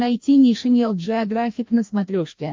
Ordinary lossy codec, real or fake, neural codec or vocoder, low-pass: MP3, 48 kbps; fake; codec, 16 kHz, 1 kbps, FreqCodec, larger model; 7.2 kHz